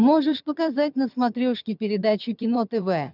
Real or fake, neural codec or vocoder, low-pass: fake; vocoder, 22.05 kHz, 80 mel bands, HiFi-GAN; 5.4 kHz